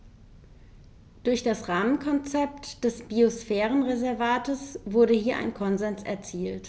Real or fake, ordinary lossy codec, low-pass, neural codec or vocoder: real; none; none; none